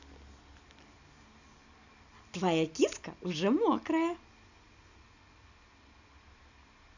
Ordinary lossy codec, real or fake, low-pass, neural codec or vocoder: none; real; 7.2 kHz; none